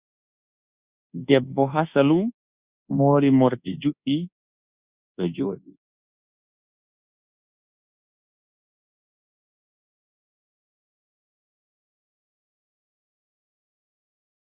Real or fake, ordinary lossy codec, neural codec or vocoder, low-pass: fake; Opus, 64 kbps; codec, 24 kHz, 1.2 kbps, DualCodec; 3.6 kHz